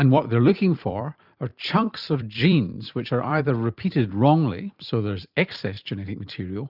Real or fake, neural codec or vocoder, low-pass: fake; vocoder, 44.1 kHz, 128 mel bands every 256 samples, BigVGAN v2; 5.4 kHz